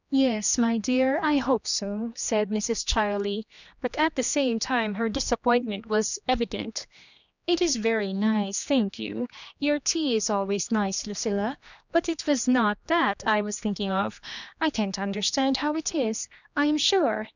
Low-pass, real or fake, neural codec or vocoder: 7.2 kHz; fake; codec, 16 kHz, 2 kbps, X-Codec, HuBERT features, trained on general audio